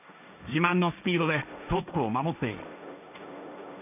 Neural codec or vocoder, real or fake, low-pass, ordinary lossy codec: codec, 16 kHz, 1.1 kbps, Voila-Tokenizer; fake; 3.6 kHz; none